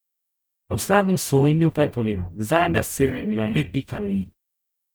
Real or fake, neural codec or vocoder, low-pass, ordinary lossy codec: fake; codec, 44.1 kHz, 0.9 kbps, DAC; none; none